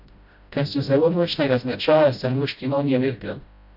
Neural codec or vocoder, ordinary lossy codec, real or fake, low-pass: codec, 16 kHz, 0.5 kbps, FreqCodec, smaller model; Opus, 64 kbps; fake; 5.4 kHz